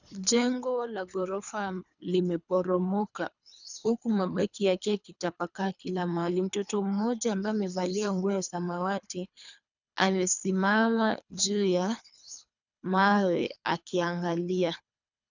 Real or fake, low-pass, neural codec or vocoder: fake; 7.2 kHz; codec, 24 kHz, 3 kbps, HILCodec